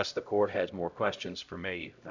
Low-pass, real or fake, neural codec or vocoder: 7.2 kHz; fake; codec, 16 kHz, 0.5 kbps, X-Codec, HuBERT features, trained on LibriSpeech